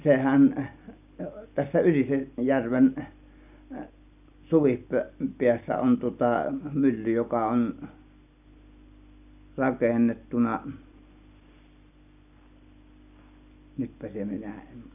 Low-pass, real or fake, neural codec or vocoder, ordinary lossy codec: 3.6 kHz; real; none; none